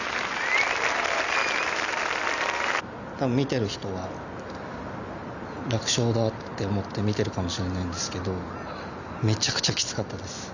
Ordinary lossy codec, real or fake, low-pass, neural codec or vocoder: none; real; 7.2 kHz; none